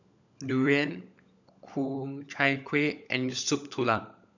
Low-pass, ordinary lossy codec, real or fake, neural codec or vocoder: 7.2 kHz; none; fake; codec, 16 kHz, 16 kbps, FunCodec, trained on LibriTTS, 50 frames a second